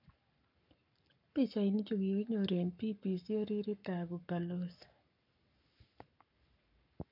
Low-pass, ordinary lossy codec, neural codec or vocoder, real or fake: 5.4 kHz; none; codec, 44.1 kHz, 7.8 kbps, Pupu-Codec; fake